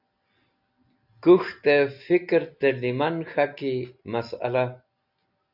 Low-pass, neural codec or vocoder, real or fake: 5.4 kHz; none; real